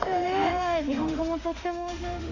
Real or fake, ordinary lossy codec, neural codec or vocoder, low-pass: fake; none; autoencoder, 48 kHz, 32 numbers a frame, DAC-VAE, trained on Japanese speech; 7.2 kHz